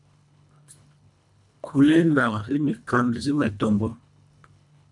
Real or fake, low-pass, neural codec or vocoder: fake; 10.8 kHz; codec, 24 kHz, 1.5 kbps, HILCodec